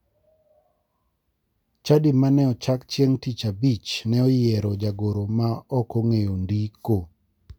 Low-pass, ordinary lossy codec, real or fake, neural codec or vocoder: 19.8 kHz; none; real; none